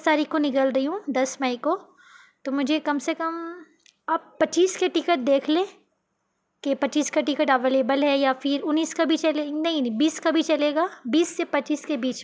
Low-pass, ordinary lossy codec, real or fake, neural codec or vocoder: none; none; real; none